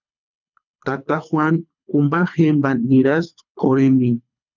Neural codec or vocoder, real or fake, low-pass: codec, 24 kHz, 3 kbps, HILCodec; fake; 7.2 kHz